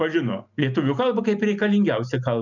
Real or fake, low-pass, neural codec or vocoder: real; 7.2 kHz; none